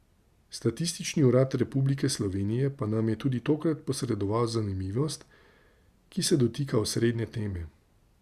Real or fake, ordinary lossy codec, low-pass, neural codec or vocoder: real; Opus, 64 kbps; 14.4 kHz; none